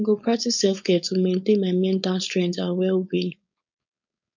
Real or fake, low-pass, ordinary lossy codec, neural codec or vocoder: fake; 7.2 kHz; none; codec, 16 kHz, 4.8 kbps, FACodec